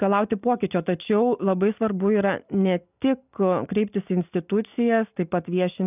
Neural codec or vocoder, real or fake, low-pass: none; real; 3.6 kHz